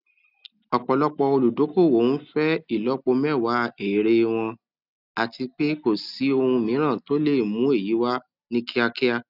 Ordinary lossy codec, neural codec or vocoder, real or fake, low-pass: none; none; real; 5.4 kHz